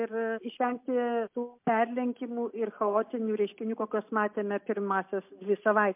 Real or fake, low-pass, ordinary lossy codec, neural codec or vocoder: real; 3.6 kHz; MP3, 32 kbps; none